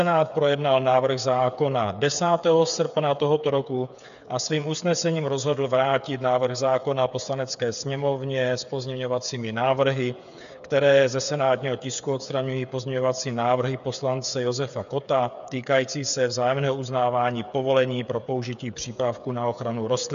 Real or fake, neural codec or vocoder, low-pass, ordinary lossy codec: fake; codec, 16 kHz, 8 kbps, FreqCodec, smaller model; 7.2 kHz; MP3, 96 kbps